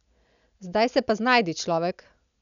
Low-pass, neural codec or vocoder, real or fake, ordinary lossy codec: 7.2 kHz; none; real; none